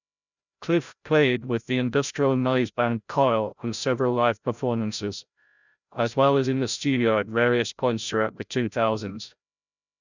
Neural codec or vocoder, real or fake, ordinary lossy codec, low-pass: codec, 16 kHz, 0.5 kbps, FreqCodec, larger model; fake; none; 7.2 kHz